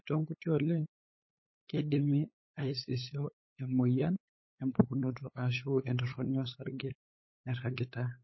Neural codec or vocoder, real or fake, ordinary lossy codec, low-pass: codec, 16 kHz, 8 kbps, FunCodec, trained on LibriTTS, 25 frames a second; fake; MP3, 24 kbps; 7.2 kHz